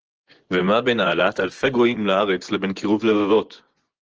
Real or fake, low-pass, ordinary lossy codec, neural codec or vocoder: fake; 7.2 kHz; Opus, 16 kbps; vocoder, 24 kHz, 100 mel bands, Vocos